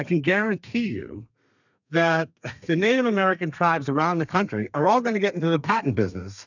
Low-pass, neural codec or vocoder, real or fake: 7.2 kHz; codec, 32 kHz, 1.9 kbps, SNAC; fake